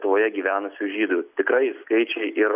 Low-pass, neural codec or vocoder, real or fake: 3.6 kHz; none; real